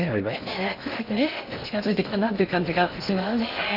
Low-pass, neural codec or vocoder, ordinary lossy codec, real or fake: 5.4 kHz; codec, 16 kHz in and 24 kHz out, 0.6 kbps, FocalCodec, streaming, 2048 codes; none; fake